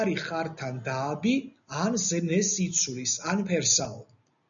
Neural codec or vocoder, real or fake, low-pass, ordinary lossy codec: none; real; 7.2 kHz; MP3, 96 kbps